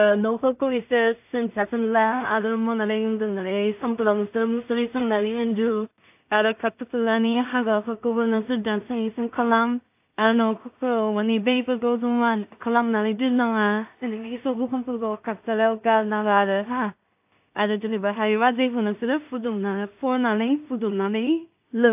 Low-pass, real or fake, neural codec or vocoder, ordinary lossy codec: 3.6 kHz; fake; codec, 16 kHz in and 24 kHz out, 0.4 kbps, LongCat-Audio-Codec, two codebook decoder; AAC, 32 kbps